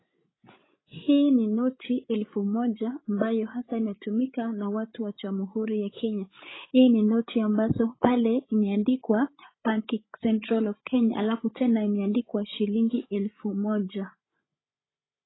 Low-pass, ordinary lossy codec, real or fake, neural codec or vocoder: 7.2 kHz; AAC, 16 kbps; fake; codec, 16 kHz, 16 kbps, FreqCodec, larger model